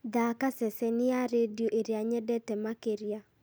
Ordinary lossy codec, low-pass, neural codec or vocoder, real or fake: none; none; none; real